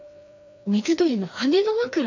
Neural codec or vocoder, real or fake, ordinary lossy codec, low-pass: codec, 16 kHz, 2 kbps, FreqCodec, larger model; fake; none; 7.2 kHz